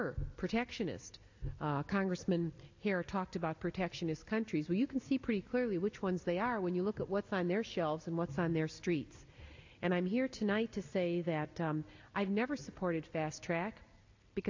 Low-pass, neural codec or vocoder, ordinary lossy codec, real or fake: 7.2 kHz; none; AAC, 48 kbps; real